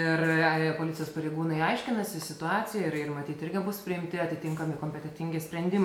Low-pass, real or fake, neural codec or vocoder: 19.8 kHz; real; none